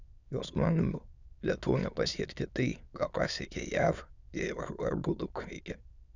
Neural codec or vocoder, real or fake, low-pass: autoencoder, 22.05 kHz, a latent of 192 numbers a frame, VITS, trained on many speakers; fake; 7.2 kHz